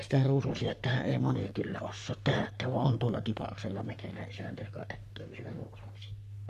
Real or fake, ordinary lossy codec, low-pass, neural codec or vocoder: fake; none; 14.4 kHz; codec, 44.1 kHz, 3.4 kbps, Pupu-Codec